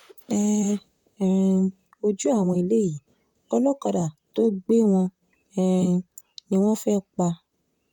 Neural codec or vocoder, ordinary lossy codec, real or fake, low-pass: vocoder, 44.1 kHz, 128 mel bands, Pupu-Vocoder; none; fake; 19.8 kHz